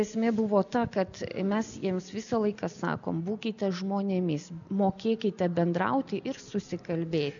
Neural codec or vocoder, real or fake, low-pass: none; real; 7.2 kHz